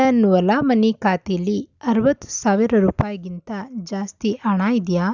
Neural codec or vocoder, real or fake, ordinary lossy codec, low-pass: none; real; none; 7.2 kHz